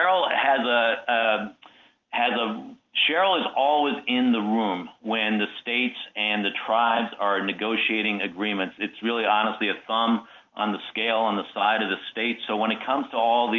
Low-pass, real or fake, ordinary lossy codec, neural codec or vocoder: 7.2 kHz; real; Opus, 24 kbps; none